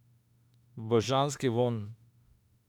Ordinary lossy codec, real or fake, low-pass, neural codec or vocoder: none; fake; 19.8 kHz; autoencoder, 48 kHz, 32 numbers a frame, DAC-VAE, trained on Japanese speech